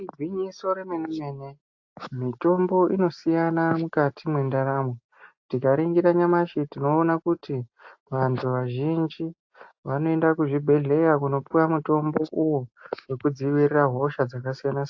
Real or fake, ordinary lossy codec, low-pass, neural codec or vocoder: real; AAC, 48 kbps; 7.2 kHz; none